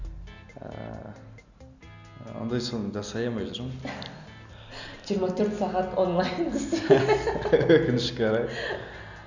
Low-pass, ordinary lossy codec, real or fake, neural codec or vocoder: 7.2 kHz; none; real; none